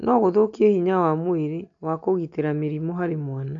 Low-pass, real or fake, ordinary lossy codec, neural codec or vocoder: 7.2 kHz; real; AAC, 64 kbps; none